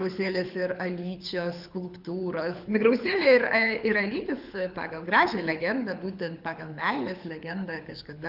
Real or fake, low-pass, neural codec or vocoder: fake; 5.4 kHz; codec, 24 kHz, 6 kbps, HILCodec